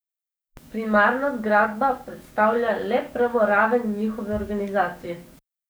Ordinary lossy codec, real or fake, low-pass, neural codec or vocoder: none; fake; none; codec, 44.1 kHz, 7.8 kbps, DAC